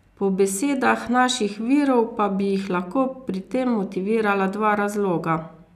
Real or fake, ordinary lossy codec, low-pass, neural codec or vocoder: real; none; 14.4 kHz; none